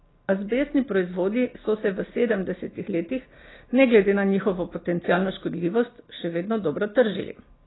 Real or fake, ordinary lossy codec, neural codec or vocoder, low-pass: real; AAC, 16 kbps; none; 7.2 kHz